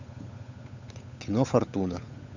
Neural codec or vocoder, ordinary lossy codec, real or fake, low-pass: codec, 16 kHz, 8 kbps, FunCodec, trained on Chinese and English, 25 frames a second; none; fake; 7.2 kHz